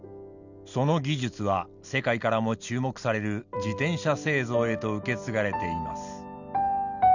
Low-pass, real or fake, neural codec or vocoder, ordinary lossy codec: 7.2 kHz; real; none; none